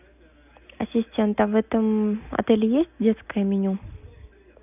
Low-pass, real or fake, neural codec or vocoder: 3.6 kHz; real; none